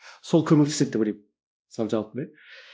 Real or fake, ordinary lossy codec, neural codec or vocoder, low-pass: fake; none; codec, 16 kHz, 1 kbps, X-Codec, WavLM features, trained on Multilingual LibriSpeech; none